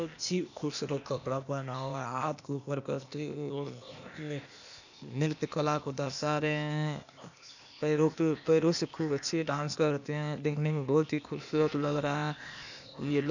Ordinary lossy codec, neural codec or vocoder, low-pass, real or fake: none; codec, 16 kHz, 0.8 kbps, ZipCodec; 7.2 kHz; fake